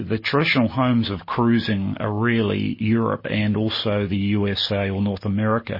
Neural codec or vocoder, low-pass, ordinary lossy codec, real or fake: none; 5.4 kHz; MP3, 24 kbps; real